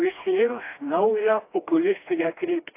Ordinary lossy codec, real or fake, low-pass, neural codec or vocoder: AAC, 24 kbps; fake; 3.6 kHz; codec, 16 kHz, 1 kbps, FreqCodec, smaller model